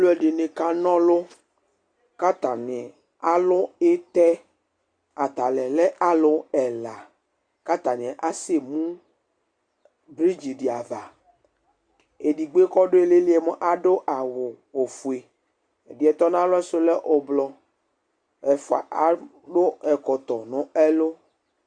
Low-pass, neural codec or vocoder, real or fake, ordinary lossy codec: 9.9 kHz; none; real; Opus, 64 kbps